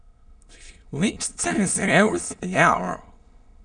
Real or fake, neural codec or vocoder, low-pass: fake; autoencoder, 22.05 kHz, a latent of 192 numbers a frame, VITS, trained on many speakers; 9.9 kHz